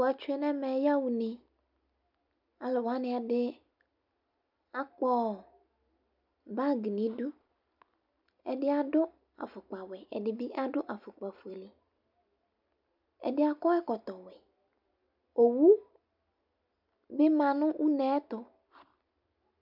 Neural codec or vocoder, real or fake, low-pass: none; real; 5.4 kHz